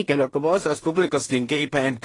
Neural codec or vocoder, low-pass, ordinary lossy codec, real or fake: codec, 16 kHz in and 24 kHz out, 0.4 kbps, LongCat-Audio-Codec, two codebook decoder; 10.8 kHz; AAC, 32 kbps; fake